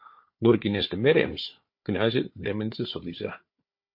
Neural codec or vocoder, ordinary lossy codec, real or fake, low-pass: codec, 16 kHz, 4 kbps, FunCodec, trained on Chinese and English, 50 frames a second; MP3, 32 kbps; fake; 5.4 kHz